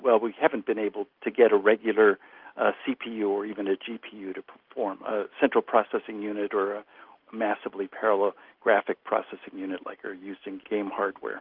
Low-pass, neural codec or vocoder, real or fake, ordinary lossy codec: 5.4 kHz; none; real; Opus, 32 kbps